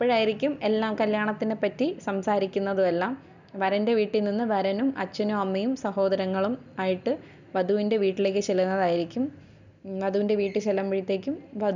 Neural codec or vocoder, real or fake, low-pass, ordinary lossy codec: none; real; 7.2 kHz; none